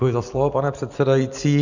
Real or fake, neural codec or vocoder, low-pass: real; none; 7.2 kHz